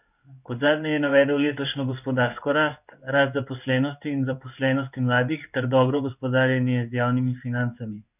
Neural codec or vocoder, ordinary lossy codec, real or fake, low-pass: codec, 16 kHz in and 24 kHz out, 1 kbps, XY-Tokenizer; none; fake; 3.6 kHz